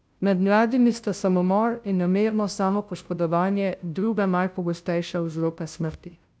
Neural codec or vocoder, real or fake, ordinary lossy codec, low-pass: codec, 16 kHz, 0.5 kbps, FunCodec, trained on Chinese and English, 25 frames a second; fake; none; none